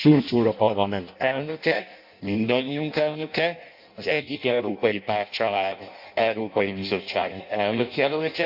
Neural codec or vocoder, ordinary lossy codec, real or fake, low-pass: codec, 16 kHz in and 24 kHz out, 0.6 kbps, FireRedTTS-2 codec; MP3, 48 kbps; fake; 5.4 kHz